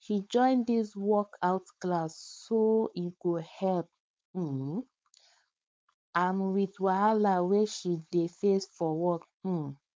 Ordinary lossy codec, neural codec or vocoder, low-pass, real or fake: none; codec, 16 kHz, 4.8 kbps, FACodec; none; fake